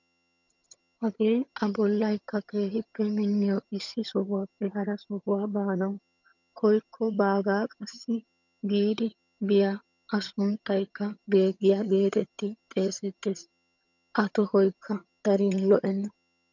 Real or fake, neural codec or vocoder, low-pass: fake; vocoder, 22.05 kHz, 80 mel bands, HiFi-GAN; 7.2 kHz